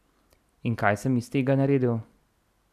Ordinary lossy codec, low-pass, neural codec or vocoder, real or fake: none; 14.4 kHz; none; real